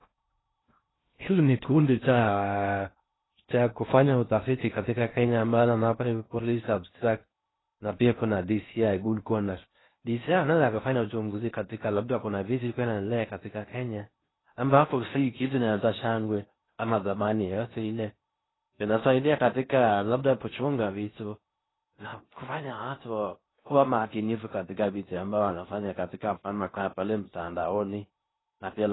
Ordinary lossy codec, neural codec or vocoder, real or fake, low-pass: AAC, 16 kbps; codec, 16 kHz in and 24 kHz out, 0.6 kbps, FocalCodec, streaming, 2048 codes; fake; 7.2 kHz